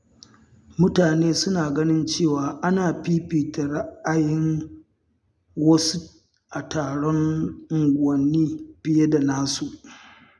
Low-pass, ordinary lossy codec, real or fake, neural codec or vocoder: none; none; real; none